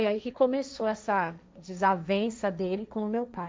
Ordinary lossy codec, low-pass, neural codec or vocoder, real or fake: none; none; codec, 16 kHz, 1.1 kbps, Voila-Tokenizer; fake